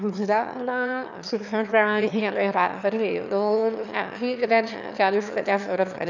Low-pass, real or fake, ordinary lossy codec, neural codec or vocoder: 7.2 kHz; fake; none; autoencoder, 22.05 kHz, a latent of 192 numbers a frame, VITS, trained on one speaker